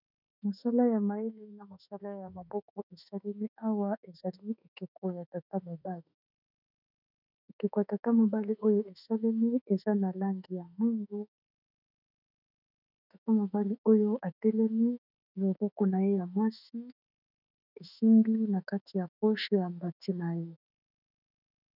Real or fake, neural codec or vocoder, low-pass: fake; autoencoder, 48 kHz, 32 numbers a frame, DAC-VAE, trained on Japanese speech; 5.4 kHz